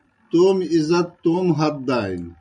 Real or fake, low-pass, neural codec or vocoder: real; 9.9 kHz; none